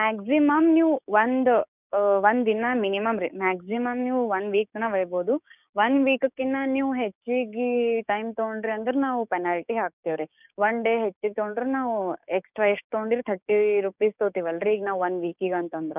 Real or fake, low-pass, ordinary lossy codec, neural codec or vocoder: fake; 3.6 kHz; none; codec, 16 kHz, 6 kbps, DAC